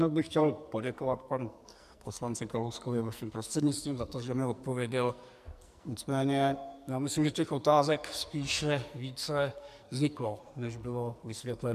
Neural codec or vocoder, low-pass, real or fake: codec, 44.1 kHz, 2.6 kbps, SNAC; 14.4 kHz; fake